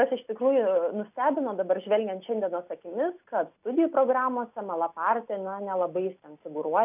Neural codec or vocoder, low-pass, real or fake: none; 3.6 kHz; real